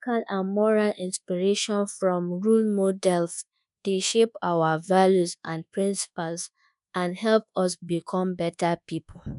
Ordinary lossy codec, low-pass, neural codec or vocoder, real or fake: none; 10.8 kHz; codec, 24 kHz, 1.2 kbps, DualCodec; fake